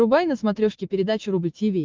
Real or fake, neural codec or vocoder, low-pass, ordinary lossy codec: real; none; 7.2 kHz; Opus, 32 kbps